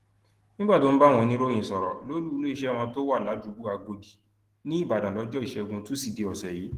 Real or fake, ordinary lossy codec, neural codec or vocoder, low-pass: fake; Opus, 16 kbps; autoencoder, 48 kHz, 128 numbers a frame, DAC-VAE, trained on Japanese speech; 14.4 kHz